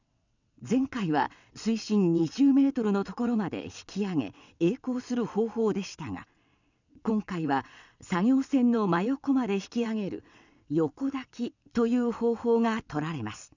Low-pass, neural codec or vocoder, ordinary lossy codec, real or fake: 7.2 kHz; vocoder, 22.05 kHz, 80 mel bands, WaveNeXt; none; fake